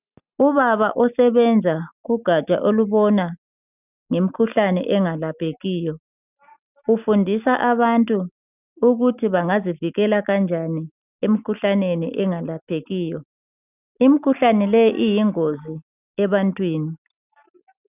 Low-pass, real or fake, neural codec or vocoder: 3.6 kHz; real; none